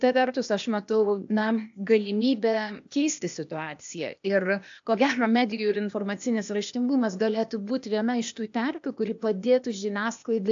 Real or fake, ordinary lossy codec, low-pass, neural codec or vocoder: fake; AAC, 64 kbps; 7.2 kHz; codec, 16 kHz, 0.8 kbps, ZipCodec